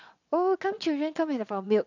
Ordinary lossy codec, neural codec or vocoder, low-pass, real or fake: none; vocoder, 22.05 kHz, 80 mel bands, WaveNeXt; 7.2 kHz; fake